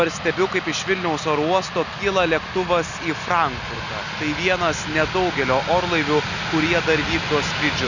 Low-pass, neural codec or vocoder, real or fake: 7.2 kHz; none; real